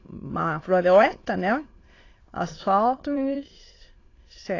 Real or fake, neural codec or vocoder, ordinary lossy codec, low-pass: fake; autoencoder, 22.05 kHz, a latent of 192 numbers a frame, VITS, trained on many speakers; AAC, 32 kbps; 7.2 kHz